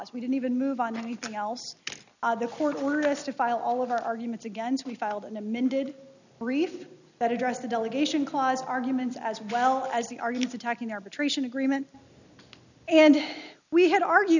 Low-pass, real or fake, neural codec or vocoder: 7.2 kHz; real; none